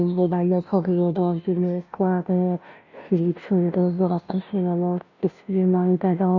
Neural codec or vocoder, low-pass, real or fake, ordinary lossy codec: codec, 16 kHz, 0.5 kbps, FunCodec, trained on Chinese and English, 25 frames a second; 7.2 kHz; fake; none